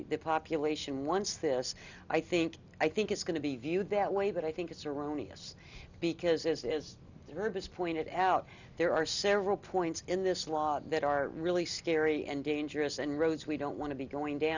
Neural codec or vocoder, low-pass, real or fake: none; 7.2 kHz; real